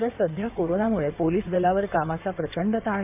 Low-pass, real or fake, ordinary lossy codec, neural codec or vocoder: 3.6 kHz; fake; none; codec, 16 kHz in and 24 kHz out, 2.2 kbps, FireRedTTS-2 codec